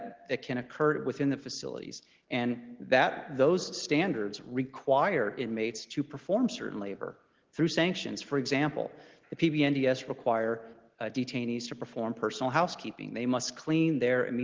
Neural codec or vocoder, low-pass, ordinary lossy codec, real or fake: none; 7.2 kHz; Opus, 16 kbps; real